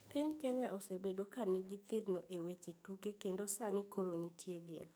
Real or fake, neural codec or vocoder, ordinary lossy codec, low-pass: fake; codec, 44.1 kHz, 2.6 kbps, SNAC; none; none